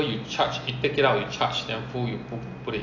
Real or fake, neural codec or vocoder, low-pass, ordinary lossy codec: real; none; 7.2 kHz; AAC, 48 kbps